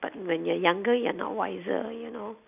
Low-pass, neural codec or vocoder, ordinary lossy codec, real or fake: 3.6 kHz; none; none; real